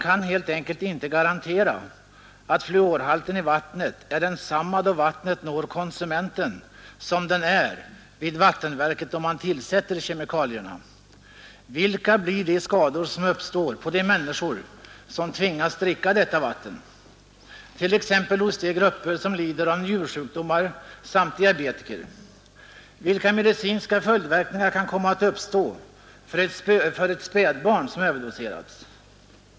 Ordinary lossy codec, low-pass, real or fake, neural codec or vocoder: none; none; real; none